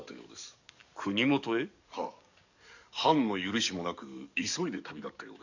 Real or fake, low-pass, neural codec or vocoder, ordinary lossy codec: fake; 7.2 kHz; codec, 44.1 kHz, 7.8 kbps, DAC; none